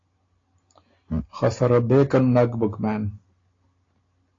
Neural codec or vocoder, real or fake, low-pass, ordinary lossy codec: none; real; 7.2 kHz; AAC, 32 kbps